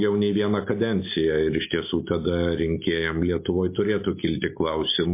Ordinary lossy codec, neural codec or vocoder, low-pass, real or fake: MP3, 24 kbps; none; 3.6 kHz; real